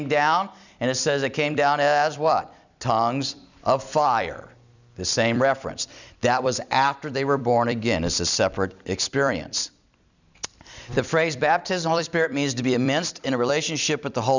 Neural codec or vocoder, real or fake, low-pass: vocoder, 44.1 kHz, 128 mel bands every 256 samples, BigVGAN v2; fake; 7.2 kHz